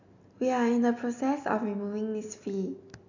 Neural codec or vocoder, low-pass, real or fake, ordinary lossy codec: none; 7.2 kHz; real; none